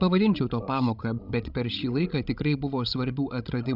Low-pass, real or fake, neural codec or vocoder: 5.4 kHz; fake; codec, 16 kHz, 16 kbps, FreqCodec, larger model